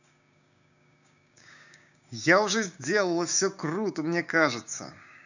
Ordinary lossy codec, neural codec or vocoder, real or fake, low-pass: none; codec, 16 kHz, 6 kbps, DAC; fake; 7.2 kHz